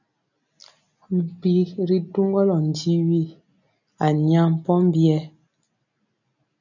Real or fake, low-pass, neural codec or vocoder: real; 7.2 kHz; none